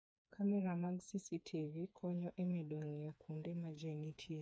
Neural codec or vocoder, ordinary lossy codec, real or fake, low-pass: codec, 16 kHz, 4 kbps, FreqCodec, smaller model; none; fake; none